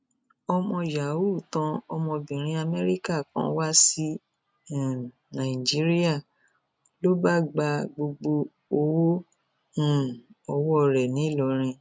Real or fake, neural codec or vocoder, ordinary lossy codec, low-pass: real; none; none; none